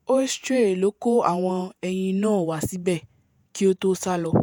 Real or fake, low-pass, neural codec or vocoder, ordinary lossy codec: fake; none; vocoder, 48 kHz, 128 mel bands, Vocos; none